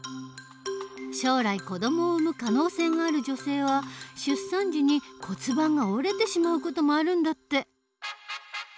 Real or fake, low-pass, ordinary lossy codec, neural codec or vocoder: real; none; none; none